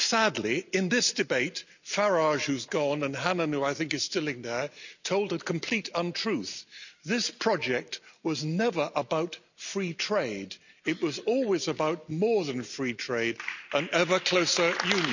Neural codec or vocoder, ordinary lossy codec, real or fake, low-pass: none; none; real; 7.2 kHz